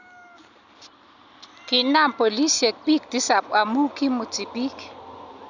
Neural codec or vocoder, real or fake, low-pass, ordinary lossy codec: none; real; 7.2 kHz; none